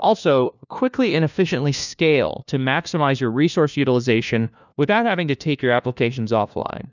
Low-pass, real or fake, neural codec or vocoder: 7.2 kHz; fake; codec, 16 kHz, 1 kbps, FunCodec, trained on LibriTTS, 50 frames a second